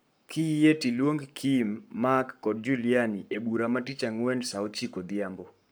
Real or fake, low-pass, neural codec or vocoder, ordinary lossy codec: fake; none; codec, 44.1 kHz, 7.8 kbps, Pupu-Codec; none